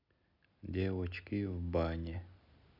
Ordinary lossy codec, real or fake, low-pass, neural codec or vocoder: none; real; 5.4 kHz; none